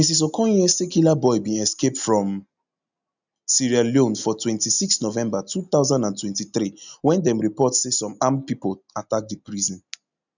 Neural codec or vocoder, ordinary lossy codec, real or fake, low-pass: none; none; real; 7.2 kHz